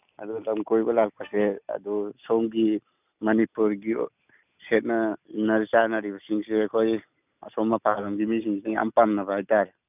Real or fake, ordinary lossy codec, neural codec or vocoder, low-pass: real; none; none; 3.6 kHz